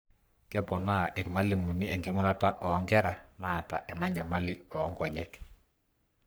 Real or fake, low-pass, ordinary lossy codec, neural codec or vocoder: fake; none; none; codec, 44.1 kHz, 3.4 kbps, Pupu-Codec